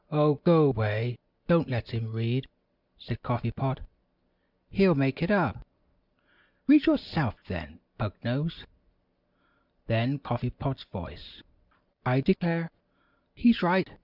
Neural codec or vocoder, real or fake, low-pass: none; real; 5.4 kHz